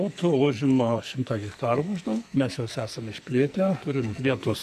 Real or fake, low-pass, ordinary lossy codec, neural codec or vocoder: fake; 14.4 kHz; MP3, 96 kbps; codec, 44.1 kHz, 2.6 kbps, SNAC